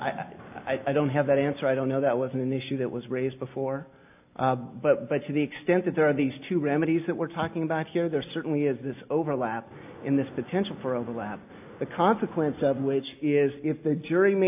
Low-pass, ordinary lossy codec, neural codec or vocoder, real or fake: 3.6 kHz; AAC, 32 kbps; none; real